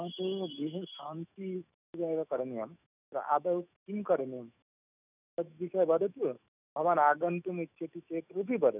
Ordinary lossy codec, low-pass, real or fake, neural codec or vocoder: none; 3.6 kHz; fake; autoencoder, 48 kHz, 128 numbers a frame, DAC-VAE, trained on Japanese speech